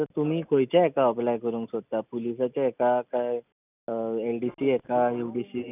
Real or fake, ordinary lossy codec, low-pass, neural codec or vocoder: real; none; 3.6 kHz; none